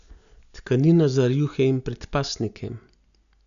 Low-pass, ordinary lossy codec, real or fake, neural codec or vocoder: 7.2 kHz; none; real; none